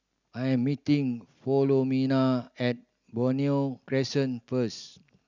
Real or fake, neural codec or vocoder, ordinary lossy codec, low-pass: real; none; none; 7.2 kHz